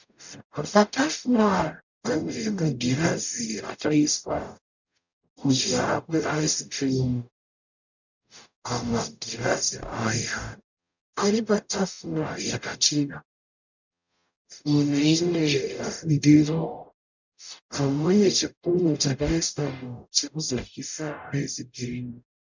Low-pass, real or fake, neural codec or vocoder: 7.2 kHz; fake; codec, 44.1 kHz, 0.9 kbps, DAC